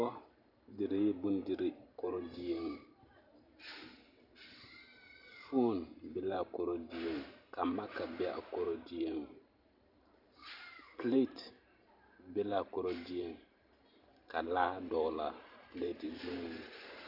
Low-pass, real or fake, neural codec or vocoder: 5.4 kHz; fake; vocoder, 44.1 kHz, 128 mel bands every 512 samples, BigVGAN v2